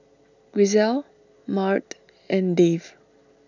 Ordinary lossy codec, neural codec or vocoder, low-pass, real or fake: none; none; 7.2 kHz; real